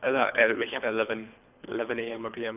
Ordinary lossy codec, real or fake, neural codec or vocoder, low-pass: none; fake; codec, 24 kHz, 3 kbps, HILCodec; 3.6 kHz